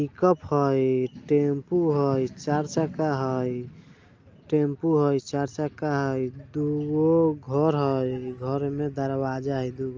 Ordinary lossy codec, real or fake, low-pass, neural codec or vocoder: Opus, 24 kbps; real; 7.2 kHz; none